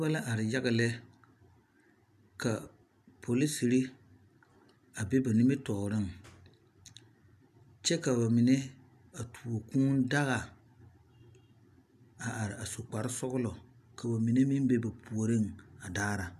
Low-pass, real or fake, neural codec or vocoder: 14.4 kHz; real; none